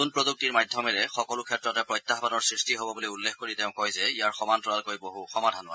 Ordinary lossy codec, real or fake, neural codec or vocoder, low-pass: none; real; none; none